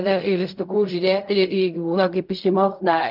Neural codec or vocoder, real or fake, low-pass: codec, 16 kHz in and 24 kHz out, 0.4 kbps, LongCat-Audio-Codec, fine tuned four codebook decoder; fake; 5.4 kHz